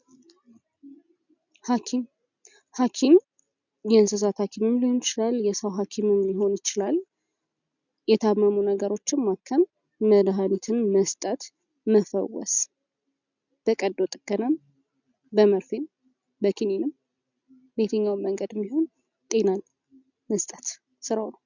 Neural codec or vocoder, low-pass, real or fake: none; 7.2 kHz; real